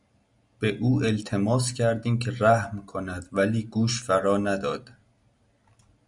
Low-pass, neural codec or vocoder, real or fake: 10.8 kHz; none; real